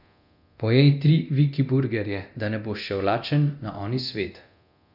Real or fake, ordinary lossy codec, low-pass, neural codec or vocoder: fake; none; 5.4 kHz; codec, 24 kHz, 0.9 kbps, DualCodec